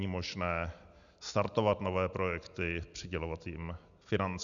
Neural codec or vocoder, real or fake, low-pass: none; real; 7.2 kHz